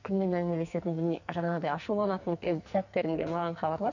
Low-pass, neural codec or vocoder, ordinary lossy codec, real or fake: 7.2 kHz; codec, 44.1 kHz, 2.6 kbps, SNAC; none; fake